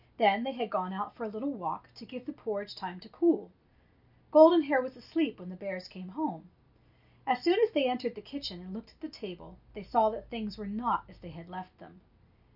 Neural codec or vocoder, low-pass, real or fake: none; 5.4 kHz; real